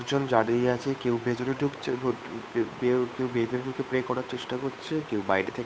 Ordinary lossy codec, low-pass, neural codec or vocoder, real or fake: none; none; codec, 16 kHz, 8 kbps, FunCodec, trained on Chinese and English, 25 frames a second; fake